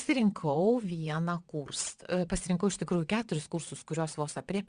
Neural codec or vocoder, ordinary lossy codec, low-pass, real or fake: vocoder, 22.05 kHz, 80 mel bands, WaveNeXt; Opus, 64 kbps; 9.9 kHz; fake